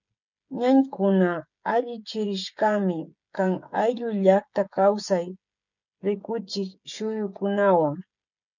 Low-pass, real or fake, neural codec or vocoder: 7.2 kHz; fake; codec, 16 kHz, 16 kbps, FreqCodec, smaller model